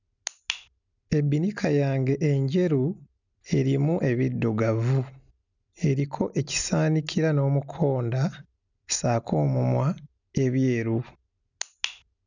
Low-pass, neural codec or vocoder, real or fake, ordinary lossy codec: 7.2 kHz; none; real; none